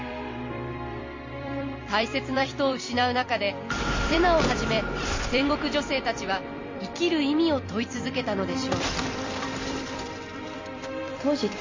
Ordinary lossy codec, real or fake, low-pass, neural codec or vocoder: MP3, 32 kbps; fake; 7.2 kHz; vocoder, 44.1 kHz, 128 mel bands every 256 samples, BigVGAN v2